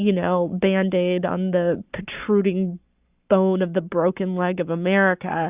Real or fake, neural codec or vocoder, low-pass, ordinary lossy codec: fake; codec, 44.1 kHz, 7.8 kbps, Pupu-Codec; 3.6 kHz; Opus, 64 kbps